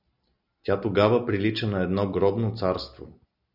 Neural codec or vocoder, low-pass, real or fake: none; 5.4 kHz; real